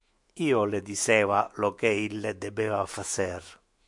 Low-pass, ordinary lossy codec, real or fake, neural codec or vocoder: 10.8 kHz; MP3, 64 kbps; fake; autoencoder, 48 kHz, 128 numbers a frame, DAC-VAE, trained on Japanese speech